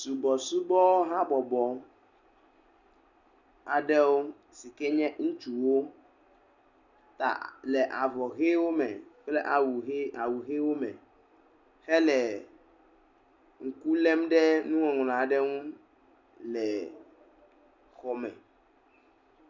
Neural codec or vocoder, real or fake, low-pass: none; real; 7.2 kHz